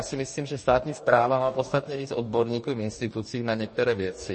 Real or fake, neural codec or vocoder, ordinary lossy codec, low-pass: fake; codec, 44.1 kHz, 2.6 kbps, DAC; MP3, 32 kbps; 10.8 kHz